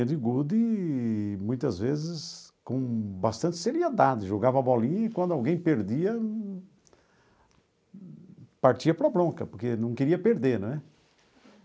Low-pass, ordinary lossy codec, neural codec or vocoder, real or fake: none; none; none; real